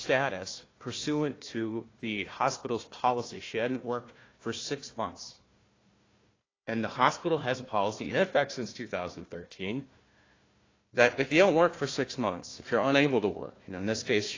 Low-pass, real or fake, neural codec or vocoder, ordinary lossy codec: 7.2 kHz; fake; codec, 16 kHz, 1 kbps, FunCodec, trained on Chinese and English, 50 frames a second; AAC, 32 kbps